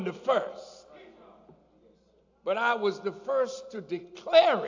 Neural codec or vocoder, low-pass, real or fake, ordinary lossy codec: none; 7.2 kHz; real; AAC, 48 kbps